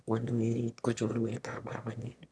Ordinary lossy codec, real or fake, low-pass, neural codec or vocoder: none; fake; none; autoencoder, 22.05 kHz, a latent of 192 numbers a frame, VITS, trained on one speaker